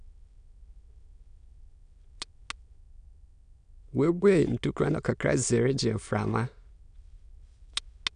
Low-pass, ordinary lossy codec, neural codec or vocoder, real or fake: 9.9 kHz; none; autoencoder, 22.05 kHz, a latent of 192 numbers a frame, VITS, trained on many speakers; fake